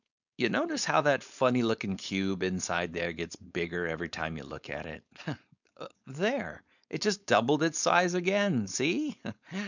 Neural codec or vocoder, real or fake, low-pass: codec, 16 kHz, 4.8 kbps, FACodec; fake; 7.2 kHz